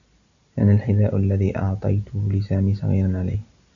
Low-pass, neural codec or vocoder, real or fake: 7.2 kHz; none; real